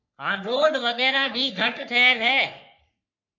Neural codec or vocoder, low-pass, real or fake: codec, 44.1 kHz, 3.4 kbps, Pupu-Codec; 7.2 kHz; fake